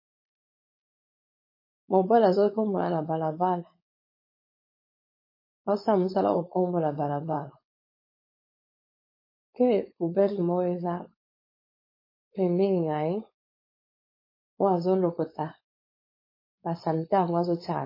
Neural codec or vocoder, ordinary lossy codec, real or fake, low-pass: codec, 16 kHz, 4.8 kbps, FACodec; MP3, 24 kbps; fake; 5.4 kHz